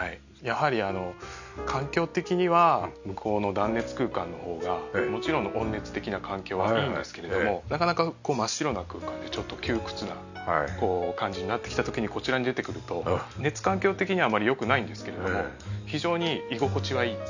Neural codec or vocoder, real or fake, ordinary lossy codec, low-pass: none; real; none; 7.2 kHz